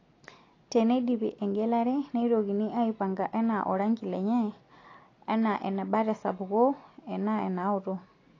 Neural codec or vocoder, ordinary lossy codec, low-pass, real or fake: none; AAC, 32 kbps; 7.2 kHz; real